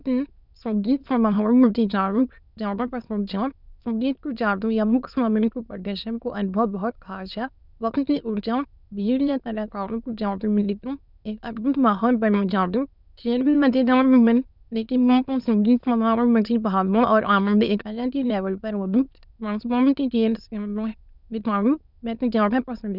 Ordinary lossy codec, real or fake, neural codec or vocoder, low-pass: none; fake; autoencoder, 22.05 kHz, a latent of 192 numbers a frame, VITS, trained on many speakers; 5.4 kHz